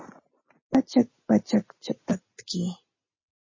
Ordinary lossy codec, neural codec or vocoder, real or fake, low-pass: MP3, 32 kbps; none; real; 7.2 kHz